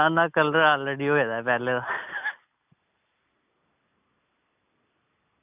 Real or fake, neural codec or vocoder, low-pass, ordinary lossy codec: real; none; 3.6 kHz; none